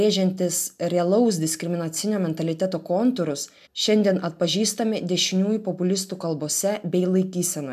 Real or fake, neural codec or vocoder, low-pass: real; none; 14.4 kHz